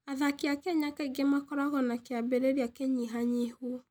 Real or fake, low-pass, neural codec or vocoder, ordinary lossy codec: real; none; none; none